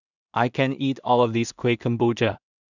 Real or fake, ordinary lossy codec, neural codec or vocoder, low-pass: fake; none; codec, 16 kHz in and 24 kHz out, 0.4 kbps, LongCat-Audio-Codec, two codebook decoder; 7.2 kHz